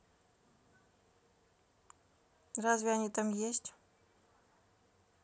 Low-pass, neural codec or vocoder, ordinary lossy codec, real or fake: none; none; none; real